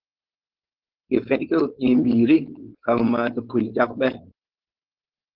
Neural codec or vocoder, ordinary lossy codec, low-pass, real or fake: codec, 16 kHz, 4.8 kbps, FACodec; Opus, 16 kbps; 5.4 kHz; fake